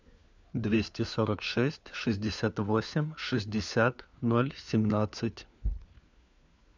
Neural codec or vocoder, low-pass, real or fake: codec, 16 kHz, 4 kbps, FunCodec, trained on LibriTTS, 50 frames a second; 7.2 kHz; fake